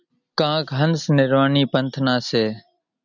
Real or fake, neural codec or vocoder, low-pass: real; none; 7.2 kHz